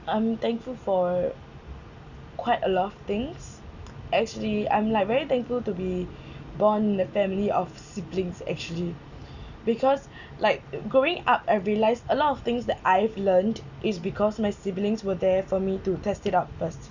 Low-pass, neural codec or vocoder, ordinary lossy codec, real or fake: 7.2 kHz; none; none; real